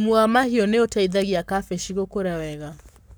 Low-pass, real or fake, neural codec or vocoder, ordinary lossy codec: none; fake; codec, 44.1 kHz, 7.8 kbps, Pupu-Codec; none